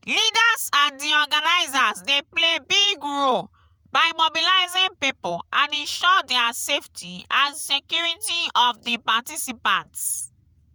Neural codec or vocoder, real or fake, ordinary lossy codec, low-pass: vocoder, 48 kHz, 128 mel bands, Vocos; fake; none; none